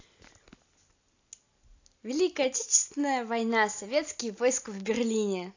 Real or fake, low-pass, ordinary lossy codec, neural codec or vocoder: real; 7.2 kHz; AAC, 48 kbps; none